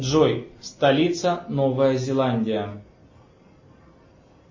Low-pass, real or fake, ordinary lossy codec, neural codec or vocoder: 7.2 kHz; real; MP3, 32 kbps; none